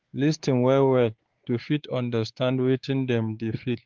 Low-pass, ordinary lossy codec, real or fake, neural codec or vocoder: 7.2 kHz; Opus, 16 kbps; fake; codec, 16 kHz, 4 kbps, X-Codec, WavLM features, trained on Multilingual LibriSpeech